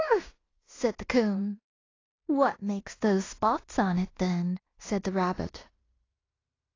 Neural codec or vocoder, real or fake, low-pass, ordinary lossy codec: codec, 16 kHz in and 24 kHz out, 0.9 kbps, LongCat-Audio-Codec, fine tuned four codebook decoder; fake; 7.2 kHz; AAC, 32 kbps